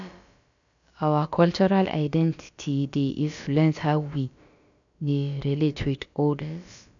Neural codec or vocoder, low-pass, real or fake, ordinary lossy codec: codec, 16 kHz, about 1 kbps, DyCAST, with the encoder's durations; 7.2 kHz; fake; none